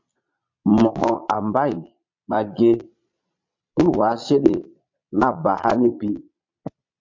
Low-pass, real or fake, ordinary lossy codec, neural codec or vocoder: 7.2 kHz; fake; MP3, 48 kbps; vocoder, 22.05 kHz, 80 mel bands, Vocos